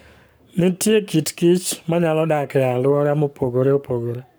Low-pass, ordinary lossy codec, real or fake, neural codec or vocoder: none; none; fake; codec, 44.1 kHz, 7.8 kbps, Pupu-Codec